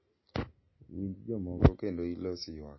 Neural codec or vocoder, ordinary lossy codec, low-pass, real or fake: none; MP3, 24 kbps; 7.2 kHz; real